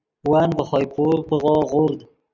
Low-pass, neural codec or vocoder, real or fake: 7.2 kHz; none; real